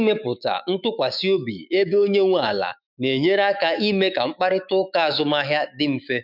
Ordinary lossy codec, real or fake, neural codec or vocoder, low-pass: none; fake; autoencoder, 48 kHz, 128 numbers a frame, DAC-VAE, trained on Japanese speech; 5.4 kHz